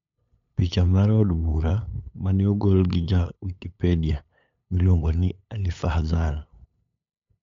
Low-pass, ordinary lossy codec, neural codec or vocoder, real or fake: 7.2 kHz; MP3, 64 kbps; codec, 16 kHz, 8 kbps, FunCodec, trained on LibriTTS, 25 frames a second; fake